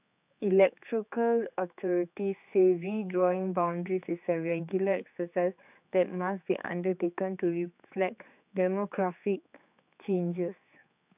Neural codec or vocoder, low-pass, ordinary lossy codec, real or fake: codec, 16 kHz, 4 kbps, X-Codec, HuBERT features, trained on general audio; 3.6 kHz; AAC, 32 kbps; fake